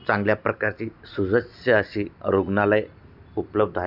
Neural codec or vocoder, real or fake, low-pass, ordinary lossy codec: none; real; 5.4 kHz; none